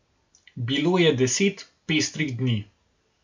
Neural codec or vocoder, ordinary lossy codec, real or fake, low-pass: none; MP3, 64 kbps; real; 7.2 kHz